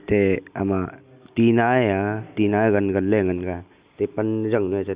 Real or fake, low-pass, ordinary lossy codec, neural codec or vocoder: real; 3.6 kHz; Opus, 64 kbps; none